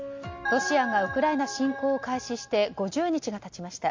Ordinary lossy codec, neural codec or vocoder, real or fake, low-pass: MP3, 48 kbps; none; real; 7.2 kHz